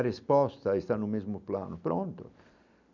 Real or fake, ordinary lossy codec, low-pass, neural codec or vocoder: real; none; 7.2 kHz; none